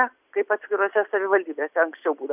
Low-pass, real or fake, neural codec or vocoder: 3.6 kHz; real; none